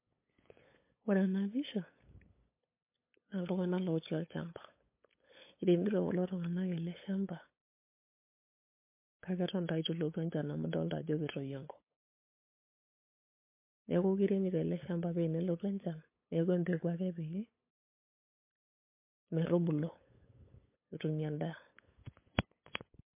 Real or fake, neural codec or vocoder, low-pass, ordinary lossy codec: fake; codec, 16 kHz, 8 kbps, FunCodec, trained on LibriTTS, 25 frames a second; 3.6 kHz; MP3, 24 kbps